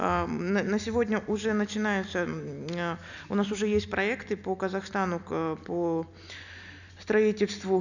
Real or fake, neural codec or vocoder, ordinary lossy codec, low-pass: real; none; none; 7.2 kHz